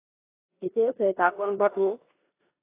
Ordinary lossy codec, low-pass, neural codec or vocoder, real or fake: AAC, 24 kbps; 3.6 kHz; codec, 16 kHz in and 24 kHz out, 0.9 kbps, LongCat-Audio-Codec, four codebook decoder; fake